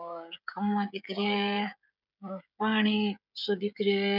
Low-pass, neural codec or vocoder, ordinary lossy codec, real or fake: 5.4 kHz; codec, 16 kHz, 16 kbps, FreqCodec, smaller model; none; fake